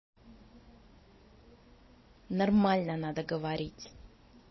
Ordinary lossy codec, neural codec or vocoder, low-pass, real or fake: MP3, 24 kbps; none; 7.2 kHz; real